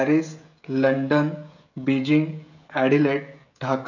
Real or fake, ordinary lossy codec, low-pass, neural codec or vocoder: fake; none; 7.2 kHz; codec, 16 kHz, 16 kbps, FreqCodec, smaller model